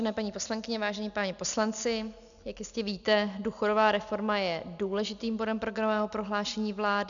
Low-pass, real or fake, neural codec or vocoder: 7.2 kHz; real; none